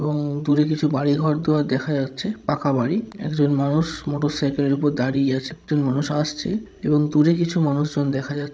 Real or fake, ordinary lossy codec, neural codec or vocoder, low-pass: fake; none; codec, 16 kHz, 16 kbps, FreqCodec, larger model; none